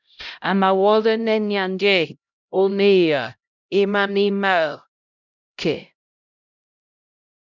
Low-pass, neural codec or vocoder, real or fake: 7.2 kHz; codec, 16 kHz, 0.5 kbps, X-Codec, HuBERT features, trained on LibriSpeech; fake